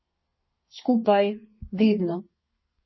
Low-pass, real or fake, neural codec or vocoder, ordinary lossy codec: 7.2 kHz; fake; codec, 32 kHz, 1.9 kbps, SNAC; MP3, 24 kbps